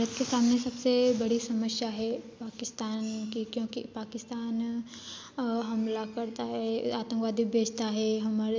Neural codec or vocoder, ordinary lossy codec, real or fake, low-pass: none; none; real; none